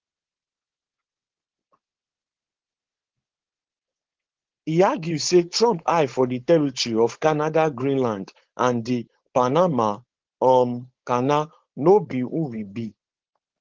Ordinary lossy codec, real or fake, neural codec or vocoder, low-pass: Opus, 16 kbps; fake; codec, 16 kHz, 4.8 kbps, FACodec; 7.2 kHz